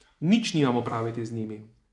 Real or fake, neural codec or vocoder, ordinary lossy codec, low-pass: fake; vocoder, 24 kHz, 100 mel bands, Vocos; none; 10.8 kHz